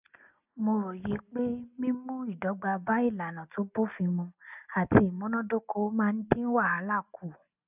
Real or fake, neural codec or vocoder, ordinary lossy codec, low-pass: real; none; none; 3.6 kHz